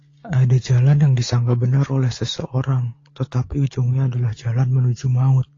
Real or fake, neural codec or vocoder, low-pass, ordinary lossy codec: real; none; 7.2 kHz; AAC, 32 kbps